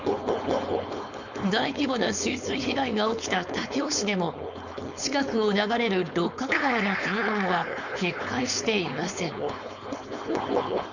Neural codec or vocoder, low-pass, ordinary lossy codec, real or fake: codec, 16 kHz, 4.8 kbps, FACodec; 7.2 kHz; none; fake